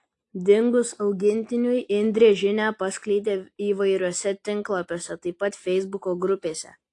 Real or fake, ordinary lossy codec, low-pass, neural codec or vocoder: real; AAC, 48 kbps; 10.8 kHz; none